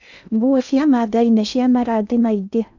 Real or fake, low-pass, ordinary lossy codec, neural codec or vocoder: fake; 7.2 kHz; none; codec, 16 kHz in and 24 kHz out, 0.6 kbps, FocalCodec, streaming, 2048 codes